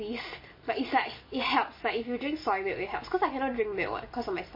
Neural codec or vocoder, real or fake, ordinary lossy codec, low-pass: none; real; MP3, 24 kbps; 5.4 kHz